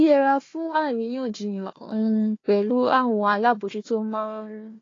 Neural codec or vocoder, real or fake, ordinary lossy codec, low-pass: codec, 16 kHz, 1 kbps, FunCodec, trained on Chinese and English, 50 frames a second; fake; AAC, 32 kbps; 7.2 kHz